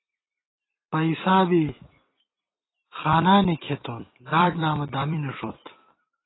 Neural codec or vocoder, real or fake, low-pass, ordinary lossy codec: vocoder, 44.1 kHz, 128 mel bands every 256 samples, BigVGAN v2; fake; 7.2 kHz; AAC, 16 kbps